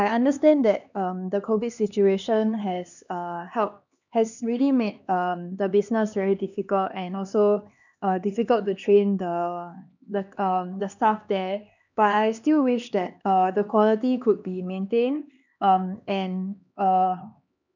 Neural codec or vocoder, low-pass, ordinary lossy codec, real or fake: codec, 16 kHz, 2 kbps, X-Codec, HuBERT features, trained on LibriSpeech; 7.2 kHz; none; fake